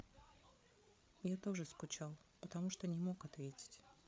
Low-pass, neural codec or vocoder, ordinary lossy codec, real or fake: none; codec, 16 kHz, 8 kbps, FreqCodec, larger model; none; fake